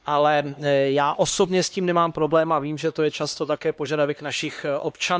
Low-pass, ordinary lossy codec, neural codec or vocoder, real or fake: none; none; codec, 16 kHz, 2 kbps, X-Codec, HuBERT features, trained on LibriSpeech; fake